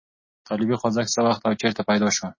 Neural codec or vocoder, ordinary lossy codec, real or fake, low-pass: none; MP3, 32 kbps; real; 7.2 kHz